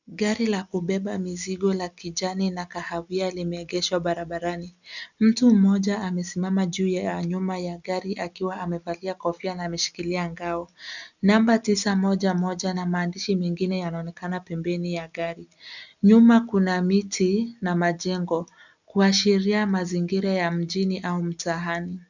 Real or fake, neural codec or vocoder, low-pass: real; none; 7.2 kHz